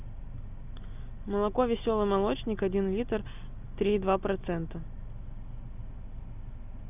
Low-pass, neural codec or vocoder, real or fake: 3.6 kHz; none; real